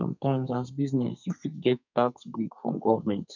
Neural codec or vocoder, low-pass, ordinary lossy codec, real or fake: codec, 32 kHz, 1.9 kbps, SNAC; 7.2 kHz; none; fake